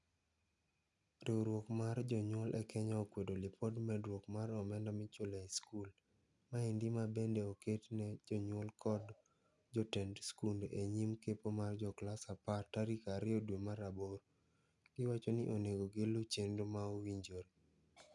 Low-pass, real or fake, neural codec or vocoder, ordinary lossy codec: 10.8 kHz; real; none; none